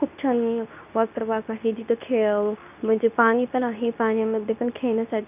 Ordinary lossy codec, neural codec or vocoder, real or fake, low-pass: none; codec, 24 kHz, 0.9 kbps, WavTokenizer, medium speech release version 2; fake; 3.6 kHz